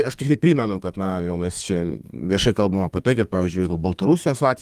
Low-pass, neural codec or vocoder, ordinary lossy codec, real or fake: 14.4 kHz; codec, 32 kHz, 1.9 kbps, SNAC; Opus, 32 kbps; fake